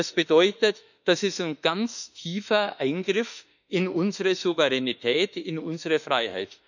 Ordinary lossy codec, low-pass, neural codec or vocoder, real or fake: none; 7.2 kHz; autoencoder, 48 kHz, 32 numbers a frame, DAC-VAE, trained on Japanese speech; fake